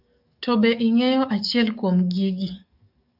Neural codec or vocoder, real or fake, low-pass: codec, 44.1 kHz, 7.8 kbps, DAC; fake; 5.4 kHz